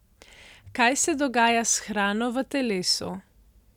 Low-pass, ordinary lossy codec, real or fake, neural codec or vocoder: 19.8 kHz; none; real; none